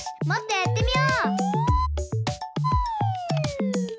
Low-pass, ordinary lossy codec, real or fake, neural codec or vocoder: none; none; real; none